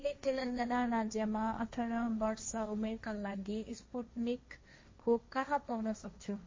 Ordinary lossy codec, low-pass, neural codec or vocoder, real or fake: MP3, 32 kbps; 7.2 kHz; codec, 16 kHz, 1.1 kbps, Voila-Tokenizer; fake